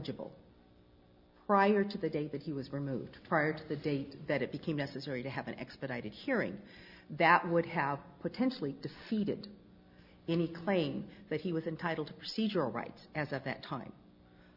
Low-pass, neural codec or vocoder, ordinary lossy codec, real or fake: 5.4 kHz; none; Opus, 64 kbps; real